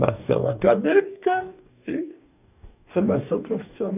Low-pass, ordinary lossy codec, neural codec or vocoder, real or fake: 3.6 kHz; none; codec, 44.1 kHz, 2.6 kbps, DAC; fake